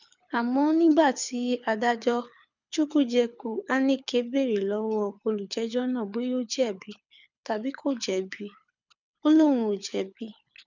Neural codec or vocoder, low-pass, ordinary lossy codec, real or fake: codec, 24 kHz, 6 kbps, HILCodec; 7.2 kHz; none; fake